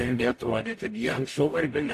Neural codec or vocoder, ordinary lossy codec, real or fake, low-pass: codec, 44.1 kHz, 0.9 kbps, DAC; AAC, 64 kbps; fake; 14.4 kHz